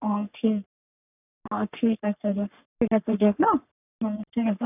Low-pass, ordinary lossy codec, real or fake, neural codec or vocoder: 3.6 kHz; none; fake; codec, 44.1 kHz, 3.4 kbps, Pupu-Codec